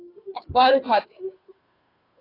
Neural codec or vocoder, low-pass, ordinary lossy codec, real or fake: codec, 16 kHz, 2 kbps, FunCodec, trained on Chinese and English, 25 frames a second; 5.4 kHz; AAC, 24 kbps; fake